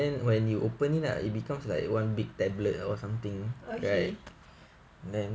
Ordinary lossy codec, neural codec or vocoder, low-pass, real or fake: none; none; none; real